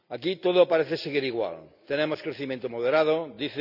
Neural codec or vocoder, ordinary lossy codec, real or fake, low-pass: none; none; real; 5.4 kHz